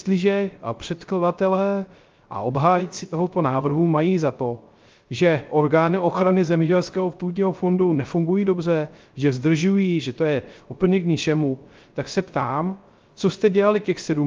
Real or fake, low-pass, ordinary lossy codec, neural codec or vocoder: fake; 7.2 kHz; Opus, 32 kbps; codec, 16 kHz, 0.3 kbps, FocalCodec